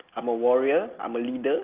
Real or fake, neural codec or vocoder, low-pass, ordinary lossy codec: real; none; 3.6 kHz; Opus, 32 kbps